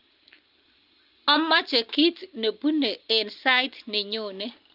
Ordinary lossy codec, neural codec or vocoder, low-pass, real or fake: Opus, 24 kbps; none; 5.4 kHz; real